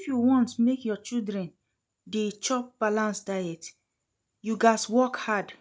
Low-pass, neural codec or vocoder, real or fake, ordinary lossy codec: none; none; real; none